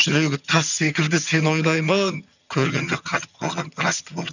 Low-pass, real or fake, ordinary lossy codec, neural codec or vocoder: 7.2 kHz; fake; none; vocoder, 22.05 kHz, 80 mel bands, HiFi-GAN